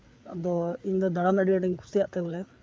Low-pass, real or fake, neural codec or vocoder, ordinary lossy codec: none; fake; codec, 16 kHz, 4 kbps, FreqCodec, larger model; none